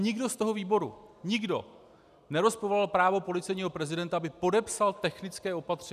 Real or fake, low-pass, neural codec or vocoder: real; 14.4 kHz; none